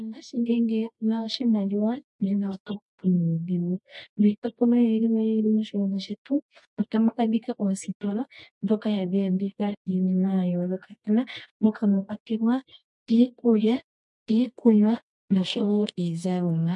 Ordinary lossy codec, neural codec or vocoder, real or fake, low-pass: MP3, 64 kbps; codec, 24 kHz, 0.9 kbps, WavTokenizer, medium music audio release; fake; 10.8 kHz